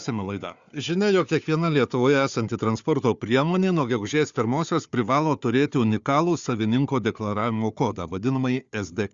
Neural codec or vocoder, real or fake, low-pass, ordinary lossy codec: codec, 16 kHz, 4 kbps, FunCodec, trained on Chinese and English, 50 frames a second; fake; 7.2 kHz; Opus, 64 kbps